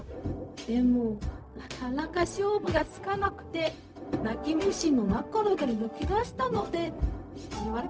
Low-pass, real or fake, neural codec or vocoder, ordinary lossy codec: none; fake; codec, 16 kHz, 0.4 kbps, LongCat-Audio-Codec; none